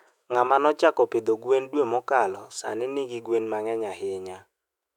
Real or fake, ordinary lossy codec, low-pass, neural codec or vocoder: fake; none; 19.8 kHz; autoencoder, 48 kHz, 128 numbers a frame, DAC-VAE, trained on Japanese speech